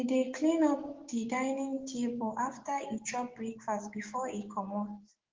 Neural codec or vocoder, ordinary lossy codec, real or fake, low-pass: codec, 16 kHz, 6 kbps, DAC; Opus, 16 kbps; fake; 7.2 kHz